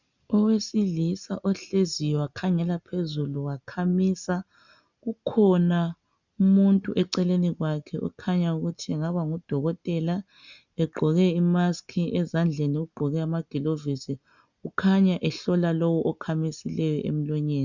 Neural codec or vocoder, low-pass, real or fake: none; 7.2 kHz; real